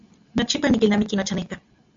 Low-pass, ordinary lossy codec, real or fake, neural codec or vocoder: 7.2 kHz; AAC, 64 kbps; real; none